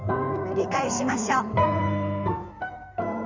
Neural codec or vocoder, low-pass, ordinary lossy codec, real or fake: codec, 16 kHz in and 24 kHz out, 2.2 kbps, FireRedTTS-2 codec; 7.2 kHz; none; fake